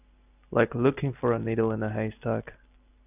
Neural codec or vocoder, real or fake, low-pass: none; real; 3.6 kHz